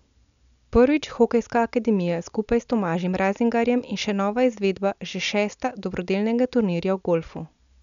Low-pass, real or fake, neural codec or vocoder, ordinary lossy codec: 7.2 kHz; real; none; none